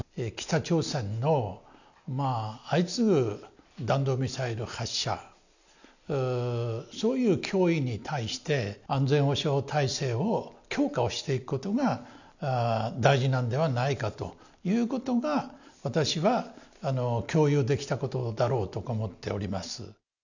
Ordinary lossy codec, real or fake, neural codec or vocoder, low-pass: none; real; none; 7.2 kHz